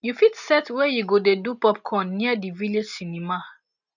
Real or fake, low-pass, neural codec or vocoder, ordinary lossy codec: real; 7.2 kHz; none; none